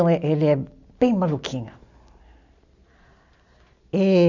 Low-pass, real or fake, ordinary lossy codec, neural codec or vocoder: 7.2 kHz; real; none; none